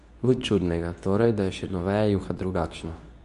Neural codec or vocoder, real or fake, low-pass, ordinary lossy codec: codec, 24 kHz, 0.9 kbps, WavTokenizer, medium speech release version 2; fake; 10.8 kHz; none